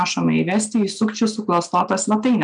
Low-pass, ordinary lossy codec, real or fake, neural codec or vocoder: 9.9 kHz; MP3, 96 kbps; real; none